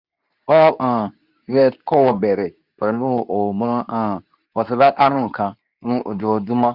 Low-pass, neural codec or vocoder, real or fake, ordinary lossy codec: 5.4 kHz; codec, 24 kHz, 0.9 kbps, WavTokenizer, medium speech release version 2; fake; Opus, 64 kbps